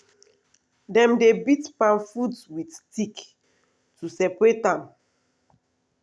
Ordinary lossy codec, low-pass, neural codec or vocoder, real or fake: none; none; none; real